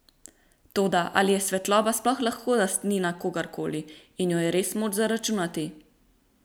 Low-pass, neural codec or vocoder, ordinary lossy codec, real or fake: none; none; none; real